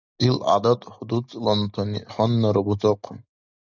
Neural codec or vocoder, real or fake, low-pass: none; real; 7.2 kHz